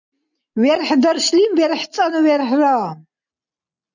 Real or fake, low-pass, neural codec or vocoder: real; 7.2 kHz; none